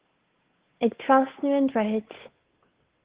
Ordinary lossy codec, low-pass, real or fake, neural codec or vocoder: Opus, 16 kbps; 3.6 kHz; fake; codec, 16 kHz, 8 kbps, FunCodec, trained on Chinese and English, 25 frames a second